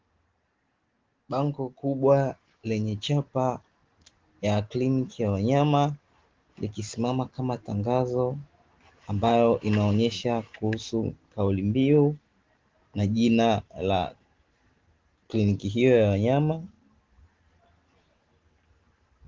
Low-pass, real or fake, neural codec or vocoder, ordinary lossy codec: 7.2 kHz; real; none; Opus, 16 kbps